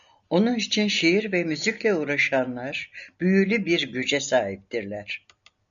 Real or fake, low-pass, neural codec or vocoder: real; 7.2 kHz; none